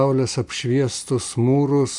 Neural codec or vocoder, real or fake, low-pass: none; real; 10.8 kHz